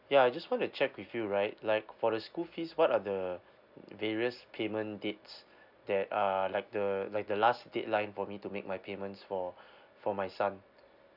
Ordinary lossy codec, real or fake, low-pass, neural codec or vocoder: none; real; 5.4 kHz; none